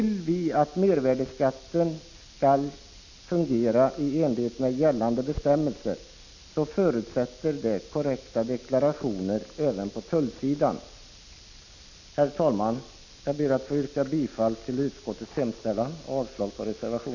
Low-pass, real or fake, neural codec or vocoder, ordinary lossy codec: 7.2 kHz; real; none; none